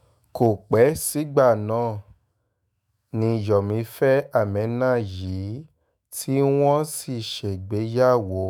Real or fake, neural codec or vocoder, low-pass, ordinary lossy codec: fake; autoencoder, 48 kHz, 128 numbers a frame, DAC-VAE, trained on Japanese speech; none; none